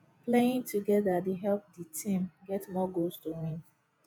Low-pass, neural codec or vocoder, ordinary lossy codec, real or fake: none; vocoder, 48 kHz, 128 mel bands, Vocos; none; fake